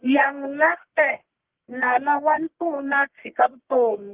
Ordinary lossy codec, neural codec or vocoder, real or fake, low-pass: Opus, 16 kbps; codec, 44.1 kHz, 1.7 kbps, Pupu-Codec; fake; 3.6 kHz